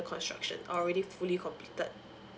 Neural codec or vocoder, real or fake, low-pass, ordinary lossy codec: none; real; none; none